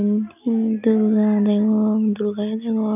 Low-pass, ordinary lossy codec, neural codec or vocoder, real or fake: 3.6 kHz; none; none; real